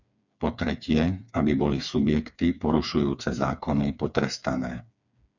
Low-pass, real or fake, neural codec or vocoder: 7.2 kHz; fake; codec, 16 kHz, 4 kbps, FreqCodec, smaller model